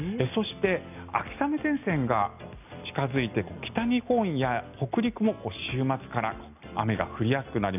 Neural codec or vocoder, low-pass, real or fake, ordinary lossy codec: none; 3.6 kHz; real; none